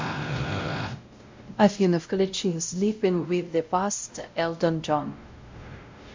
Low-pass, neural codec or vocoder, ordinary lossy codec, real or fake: 7.2 kHz; codec, 16 kHz, 0.5 kbps, X-Codec, WavLM features, trained on Multilingual LibriSpeech; MP3, 64 kbps; fake